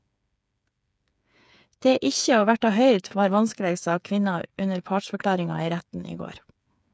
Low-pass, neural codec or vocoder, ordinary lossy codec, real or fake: none; codec, 16 kHz, 8 kbps, FreqCodec, smaller model; none; fake